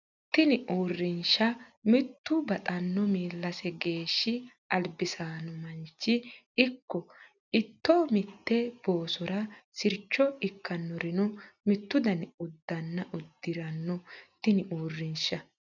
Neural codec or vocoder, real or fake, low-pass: none; real; 7.2 kHz